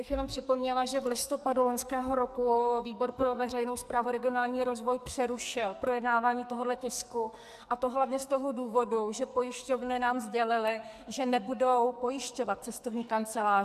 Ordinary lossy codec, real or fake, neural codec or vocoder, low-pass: AAC, 96 kbps; fake; codec, 44.1 kHz, 2.6 kbps, SNAC; 14.4 kHz